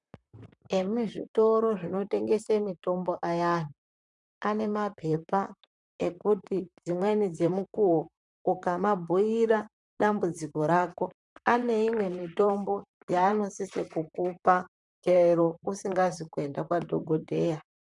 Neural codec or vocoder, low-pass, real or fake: vocoder, 44.1 kHz, 128 mel bands, Pupu-Vocoder; 10.8 kHz; fake